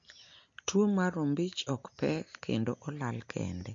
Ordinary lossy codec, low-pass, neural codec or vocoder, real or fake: MP3, 48 kbps; 7.2 kHz; none; real